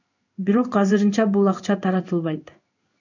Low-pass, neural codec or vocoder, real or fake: 7.2 kHz; codec, 16 kHz in and 24 kHz out, 1 kbps, XY-Tokenizer; fake